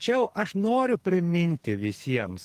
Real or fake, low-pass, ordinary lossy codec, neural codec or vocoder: fake; 14.4 kHz; Opus, 24 kbps; codec, 44.1 kHz, 2.6 kbps, SNAC